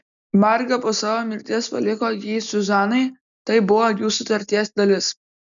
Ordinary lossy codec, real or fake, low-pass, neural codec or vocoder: MP3, 96 kbps; real; 7.2 kHz; none